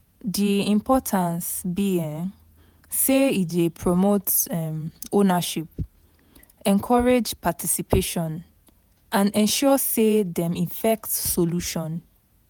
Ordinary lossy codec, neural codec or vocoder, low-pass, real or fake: none; vocoder, 48 kHz, 128 mel bands, Vocos; none; fake